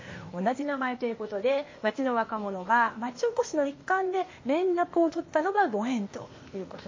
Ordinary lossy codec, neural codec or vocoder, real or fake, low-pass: MP3, 32 kbps; codec, 16 kHz, 0.8 kbps, ZipCodec; fake; 7.2 kHz